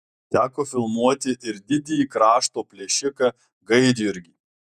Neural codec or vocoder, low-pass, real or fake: vocoder, 48 kHz, 128 mel bands, Vocos; 14.4 kHz; fake